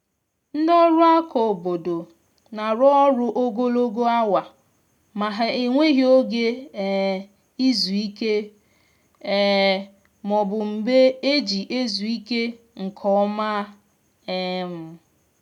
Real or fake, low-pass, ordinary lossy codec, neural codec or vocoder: real; 19.8 kHz; none; none